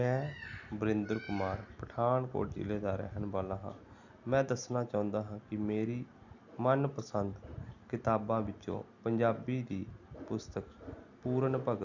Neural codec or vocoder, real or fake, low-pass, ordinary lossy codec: none; real; 7.2 kHz; none